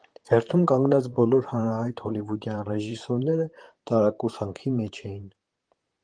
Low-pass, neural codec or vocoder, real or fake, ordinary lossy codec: 9.9 kHz; vocoder, 44.1 kHz, 128 mel bands, Pupu-Vocoder; fake; Opus, 24 kbps